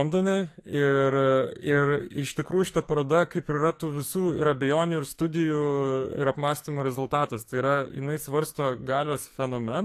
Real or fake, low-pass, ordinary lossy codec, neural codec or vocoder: fake; 14.4 kHz; AAC, 64 kbps; codec, 44.1 kHz, 2.6 kbps, SNAC